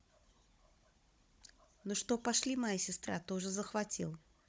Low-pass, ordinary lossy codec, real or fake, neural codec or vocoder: none; none; fake; codec, 16 kHz, 16 kbps, FunCodec, trained on Chinese and English, 50 frames a second